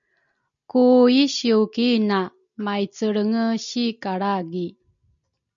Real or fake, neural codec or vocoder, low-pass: real; none; 7.2 kHz